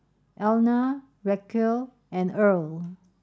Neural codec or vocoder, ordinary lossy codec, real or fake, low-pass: none; none; real; none